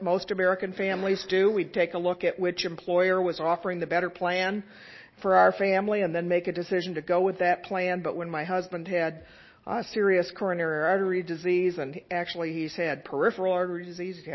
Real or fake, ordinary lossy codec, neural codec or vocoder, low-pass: real; MP3, 24 kbps; none; 7.2 kHz